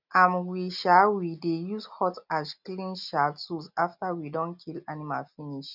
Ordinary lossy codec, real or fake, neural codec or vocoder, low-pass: none; real; none; 5.4 kHz